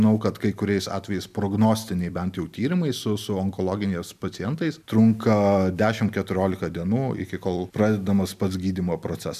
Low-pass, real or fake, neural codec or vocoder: 14.4 kHz; real; none